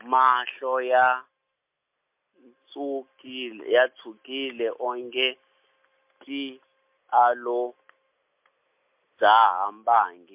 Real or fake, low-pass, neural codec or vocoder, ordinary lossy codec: real; 3.6 kHz; none; MP3, 32 kbps